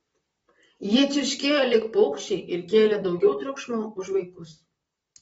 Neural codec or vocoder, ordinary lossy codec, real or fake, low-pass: vocoder, 44.1 kHz, 128 mel bands, Pupu-Vocoder; AAC, 24 kbps; fake; 19.8 kHz